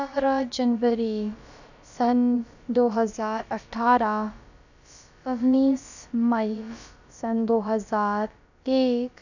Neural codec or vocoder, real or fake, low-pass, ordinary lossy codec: codec, 16 kHz, about 1 kbps, DyCAST, with the encoder's durations; fake; 7.2 kHz; none